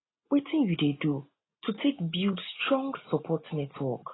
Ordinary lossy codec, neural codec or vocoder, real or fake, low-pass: AAC, 16 kbps; none; real; 7.2 kHz